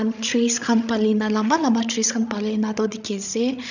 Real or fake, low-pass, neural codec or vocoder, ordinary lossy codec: fake; 7.2 kHz; codec, 16 kHz, 16 kbps, FreqCodec, larger model; none